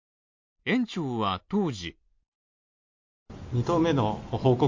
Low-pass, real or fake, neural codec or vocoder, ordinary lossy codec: 7.2 kHz; real; none; MP3, 48 kbps